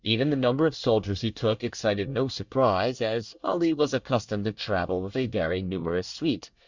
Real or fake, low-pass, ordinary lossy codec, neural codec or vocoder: fake; 7.2 kHz; Opus, 64 kbps; codec, 24 kHz, 1 kbps, SNAC